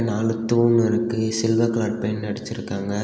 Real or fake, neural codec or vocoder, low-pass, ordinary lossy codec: real; none; none; none